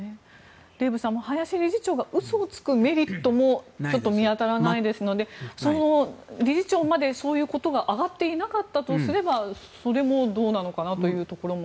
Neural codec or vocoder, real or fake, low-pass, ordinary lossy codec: none; real; none; none